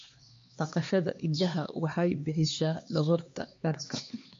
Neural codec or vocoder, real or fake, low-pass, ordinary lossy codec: codec, 16 kHz, 2 kbps, X-Codec, HuBERT features, trained on LibriSpeech; fake; 7.2 kHz; MP3, 48 kbps